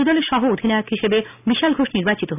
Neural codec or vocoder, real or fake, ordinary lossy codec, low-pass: none; real; none; 3.6 kHz